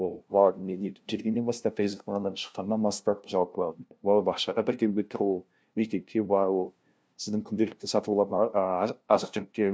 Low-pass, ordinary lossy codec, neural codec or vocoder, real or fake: none; none; codec, 16 kHz, 0.5 kbps, FunCodec, trained on LibriTTS, 25 frames a second; fake